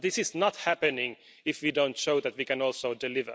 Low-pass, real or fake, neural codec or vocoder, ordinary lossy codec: none; real; none; none